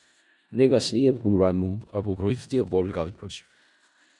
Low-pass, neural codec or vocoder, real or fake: 10.8 kHz; codec, 16 kHz in and 24 kHz out, 0.4 kbps, LongCat-Audio-Codec, four codebook decoder; fake